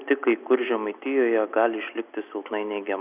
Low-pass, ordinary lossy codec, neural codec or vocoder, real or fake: 3.6 kHz; Opus, 64 kbps; none; real